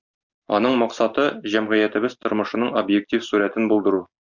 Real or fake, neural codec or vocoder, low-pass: real; none; 7.2 kHz